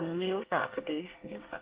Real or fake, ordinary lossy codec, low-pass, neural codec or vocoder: fake; Opus, 16 kbps; 3.6 kHz; codec, 24 kHz, 1 kbps, SNAC